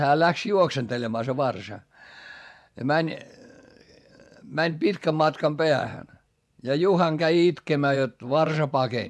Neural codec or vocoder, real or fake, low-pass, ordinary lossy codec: vocoder, 24 kHz, 100 mel bands, Vocos; fake; none; none